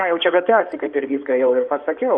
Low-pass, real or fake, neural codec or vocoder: 9.9 kHz; fake; codec, 16 kHz in and 24 kHz out, 2.2 kbps, FireRedTTS-2 codec